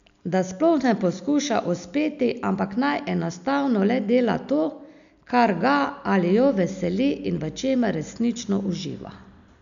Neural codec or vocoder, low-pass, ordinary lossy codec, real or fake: none; 7.2 kHz; none; real